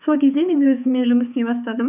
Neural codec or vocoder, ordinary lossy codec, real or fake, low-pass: codec, 16 kHz, 4 kbps, X-Codec, HuBERT features, trained on balanced general audio; none; fake; 3.6 kHz